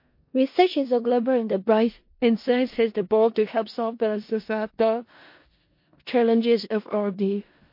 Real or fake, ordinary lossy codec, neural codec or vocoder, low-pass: fake; MP3, 32 kbps; codec, 16 kHz in and 24 kHz out, 0.4 kbps, LongCat-Audio-Codec, four codebook decoder; 5.4 kHz